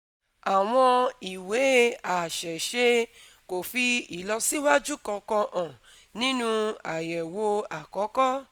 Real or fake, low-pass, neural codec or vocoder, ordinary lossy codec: real; 19.8 kHz; none; none